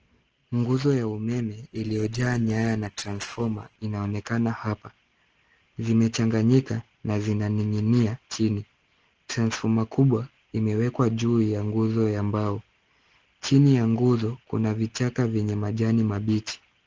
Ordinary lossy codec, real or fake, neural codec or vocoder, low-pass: Opus, 16 kbps; real; none; 7.2 kHz